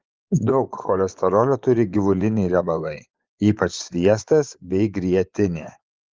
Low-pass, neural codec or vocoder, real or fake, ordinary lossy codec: 7.2 kHz; vocoder, 24 kHz, 100 mel bands, Vocos; fake; Opus, 32 kbps